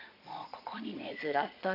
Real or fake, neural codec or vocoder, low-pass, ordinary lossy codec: fake; vocoder, 22.05 kHz, 80 mel bands, Vocos; 5.4 kHz; none